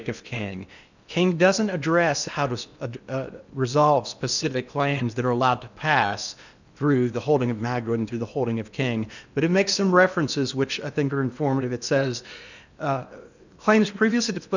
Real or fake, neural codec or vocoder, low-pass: fake; codec, 16 kHz in and 24 kHz out, 0.8 kbps, FocalCodec, streaming, 65536 codes; 7.2 kHz